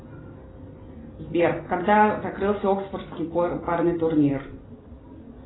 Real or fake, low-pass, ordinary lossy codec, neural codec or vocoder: real; 7.2 kHz; AAC, 16 kbps; none